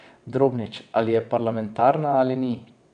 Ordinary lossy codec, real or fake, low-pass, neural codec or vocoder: none; fake; 9.9 kHz; vocoder, 22.05 kHz, 80 mel bands, WaveNeXt